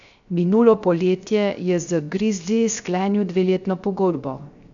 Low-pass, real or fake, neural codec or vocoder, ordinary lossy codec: 7.2 kHz; fake; codec, 16 kHz, 0.3 kbps, FocalCodec; none